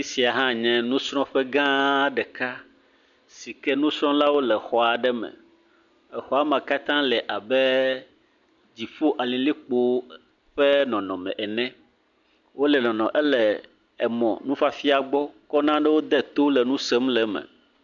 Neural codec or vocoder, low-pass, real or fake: none; 7.2 kHz; real